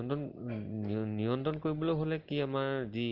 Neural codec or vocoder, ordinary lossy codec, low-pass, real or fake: none; Opus, 16 kbps; 5.4 kHz; real